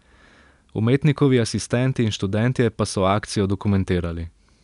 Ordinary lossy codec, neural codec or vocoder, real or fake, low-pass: none; none; real; 10.8 kHz